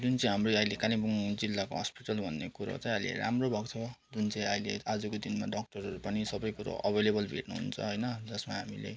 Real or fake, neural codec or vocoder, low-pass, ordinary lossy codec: real; none; none; none